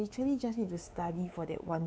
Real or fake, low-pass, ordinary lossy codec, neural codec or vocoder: fake; none; none; codec, 16 kHz, 4 kbps, X-Codec, WavLM features, trained on Multilingual LibriSpeech